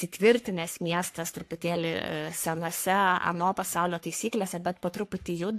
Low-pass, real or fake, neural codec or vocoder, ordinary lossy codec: 14.4 kHz; fake; codec, 44.1 kHz, 3.4 kbps, Pupu-Codec; AAC, 64 kbps